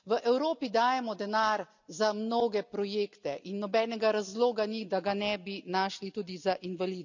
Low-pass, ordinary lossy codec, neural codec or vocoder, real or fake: 7.2 kHz; none; none; real